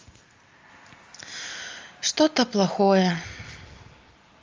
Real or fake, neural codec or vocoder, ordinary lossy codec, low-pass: real; none; Opus, 32 kbps; 7.2 kHz